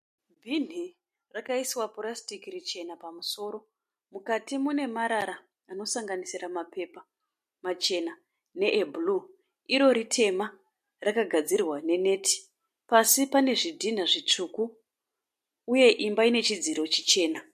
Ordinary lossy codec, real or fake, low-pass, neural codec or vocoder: MP3, 64 kbps; real; 14.4 kHz; none